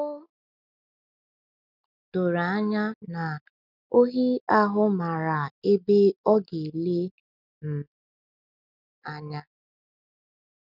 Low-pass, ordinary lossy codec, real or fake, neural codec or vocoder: 5.4 kHz; none; real; none